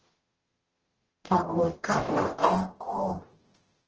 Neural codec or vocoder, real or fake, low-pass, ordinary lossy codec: codec, 44.1 kHz, 0.9 kbps, DAC; fake; 7.2 kHz; Opus, 16 kbps